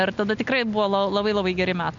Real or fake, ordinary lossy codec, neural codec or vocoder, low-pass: real; MP3, 96 kbps; none; 7.2 kHz